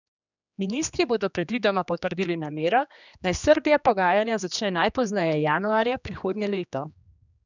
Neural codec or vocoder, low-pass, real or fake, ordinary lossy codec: codec, 16 kHz, 2 kbps, X-Codec, HuBERT features, trained on general audio; 7.2 kHz; fake; none